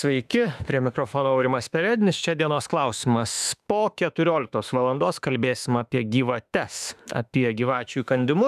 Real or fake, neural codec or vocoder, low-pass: fake; autoencoder, 48 kHz, 32 numbers a frame, DAC-VAE, trained on Japanese speech; 14.4 kHz